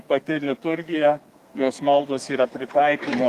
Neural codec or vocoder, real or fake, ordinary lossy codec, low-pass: codec, 32 kHz, 1.9 kbps, SNAC; fake; Opus, 32 kbps; 14.4 kHz